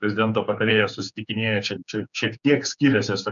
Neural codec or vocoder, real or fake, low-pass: codec, 16 kHz, 4 kbps, X-Codec, HuBERT features, trained on general audio; fake; 7.2 kHz